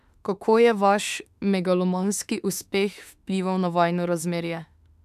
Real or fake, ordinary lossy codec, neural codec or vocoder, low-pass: fake; none; autoencoder, 48 kHz, 32 numbers a frame, DAC-VAE, trained on Japanese speech; 14.4 kHz